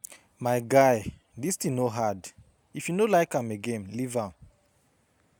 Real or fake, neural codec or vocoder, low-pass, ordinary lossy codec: real; none; none; none